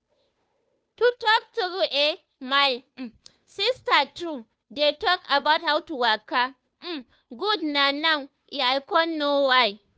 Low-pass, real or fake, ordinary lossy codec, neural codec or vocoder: none; fake; none; codec, 16 kHz, 2 kbps, FunCodec, trained on Chinese and English, 25 frames a second